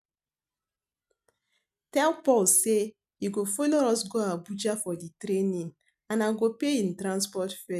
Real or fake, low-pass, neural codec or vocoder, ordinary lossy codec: real; 14.4 kHz; none; none